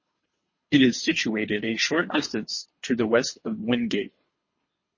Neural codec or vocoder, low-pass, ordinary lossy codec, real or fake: codec, 24 kHz, 3 kbps, HILCodec; 7.2 kHz; MP3, 32 kbps; fake